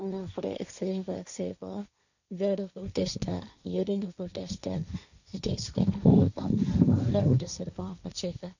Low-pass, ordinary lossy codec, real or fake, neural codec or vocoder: 7.2 kHz; none; fake; codec, 16 kHz, 1.1 kbps, Voila-Tokenizer